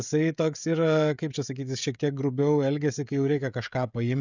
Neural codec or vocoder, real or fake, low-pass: codec, 16 kHz, 16 kbps, FreqCodec, smaller model; fake; 7.2 kHz